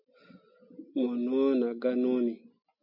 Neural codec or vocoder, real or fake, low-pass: none; real; 5.4 kHz